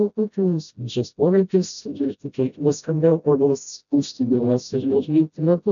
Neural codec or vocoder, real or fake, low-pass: codec, 16 kHz, 0.5 kbps, FreqCodec, smaller model; fake; 7.2 kHz